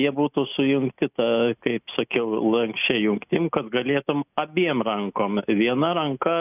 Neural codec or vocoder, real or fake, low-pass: none; real; 3.6 kHz